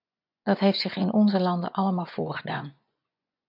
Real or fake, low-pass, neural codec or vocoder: fake; 5.4 kHz; vocoder, 24 kHz, 100 mel bands, Vocos